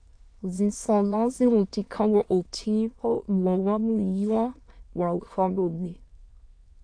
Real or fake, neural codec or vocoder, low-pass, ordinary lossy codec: fake; autoencoder, 22.05 kHz, a latent of 192 numbers a frame, VITS, trained on many speakers; 9.9 kHz; AAC, 48 kbps